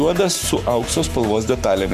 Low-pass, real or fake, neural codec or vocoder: 14.4 kHz; fake; codec, 44.1 kHz, 7.8 kbps, Pupu-Codec